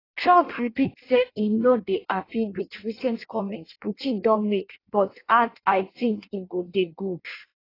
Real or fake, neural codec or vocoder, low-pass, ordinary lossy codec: fake; codec, 16 kHz in and 24 kHz out, 0.6 kbps, FireRedTTS-2 codec; 5.4 kHz; AAC, 24 kbps